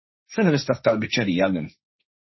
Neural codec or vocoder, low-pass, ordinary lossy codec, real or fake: codec, 16 kHz, 1.1 kbps, Voila-Tokenizer; 7.2 kHz; MP3, 24 kbps; fake